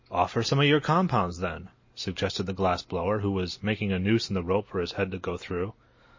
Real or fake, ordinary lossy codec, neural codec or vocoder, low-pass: real; MP3, 32 kbps; none; 7.2 kHz